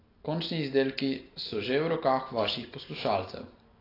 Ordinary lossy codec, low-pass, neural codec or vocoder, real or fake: AAC, 24 kbps; 5.4 kHz; none; real